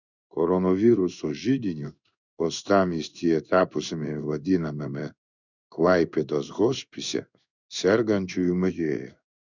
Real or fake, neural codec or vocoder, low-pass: fake; codec, 16 kHz in and 24 kHz out, 1 kbps, XY-Tokenizer; 7.2 kHz